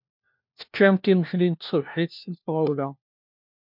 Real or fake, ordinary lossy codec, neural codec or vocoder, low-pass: fake; MP3, 48 kbps; codec, 16 kHz, 1 kbps, FunCodec, trained on LibriTTS, 50 frames a second; 5.4 kHz